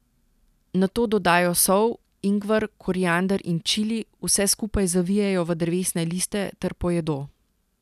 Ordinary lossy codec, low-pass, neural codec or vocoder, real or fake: none; 14.4 kHz; none; real